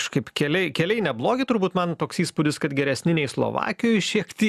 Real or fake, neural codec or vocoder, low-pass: real; none; 14.4 kHz